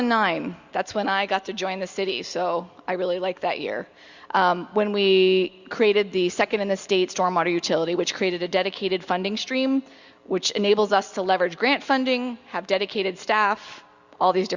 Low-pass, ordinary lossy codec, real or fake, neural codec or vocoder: 7.2 kHz; Opus, 64 kbps; real; none